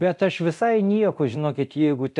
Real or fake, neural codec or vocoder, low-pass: fake; codec, 24 kHz, 0.9 kbps, DualCodec; 10.8 kHz